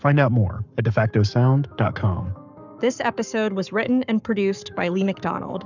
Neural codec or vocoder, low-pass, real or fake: codec, 44.1 kHz, 7.8 kbps, Pupu-Codec; 7.2 kHz; fake